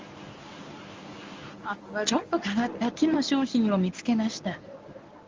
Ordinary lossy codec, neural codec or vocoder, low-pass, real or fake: Opus, 32 kbps; codec, 24 kHz, 0.9 kbps, WavTokenizer, medium speech release version 1; 7.2 kHz; fake